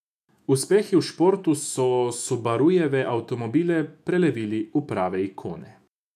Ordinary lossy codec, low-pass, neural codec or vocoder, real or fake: none; 14.4 kHz; autoencoder, 48 kHz, 128 numbers a frame, DAC-VAE, trained on Japanese speech; fake